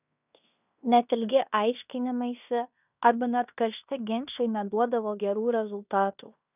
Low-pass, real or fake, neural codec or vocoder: 3.6 kHz; fake; codec, 16 kHz in and 24 kHz out, 0.9 kbps, LongCat-Audio-Codec, fine tuned four codebook decoder